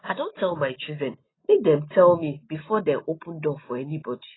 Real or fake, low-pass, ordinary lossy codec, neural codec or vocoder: real; 7.2 kHz; AAC, 16 kbps; none